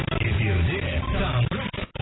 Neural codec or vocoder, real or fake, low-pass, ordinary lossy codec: none; real; 7.2 kHz; AAC, 16 kbps